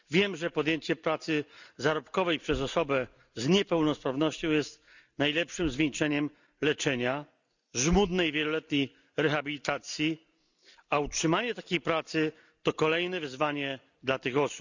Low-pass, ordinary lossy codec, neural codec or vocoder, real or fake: 7.2 kHz; none; none; real